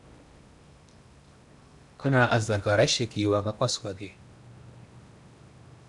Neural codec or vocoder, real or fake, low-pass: codec, 16 kHz in and 24 kHz out, 0.8 kbps, FocalCodec, streaming, 65536 codes; fake; 10.8 kHz